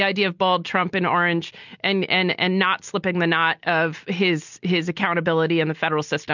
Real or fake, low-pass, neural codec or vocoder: real; 7.2 kHz; none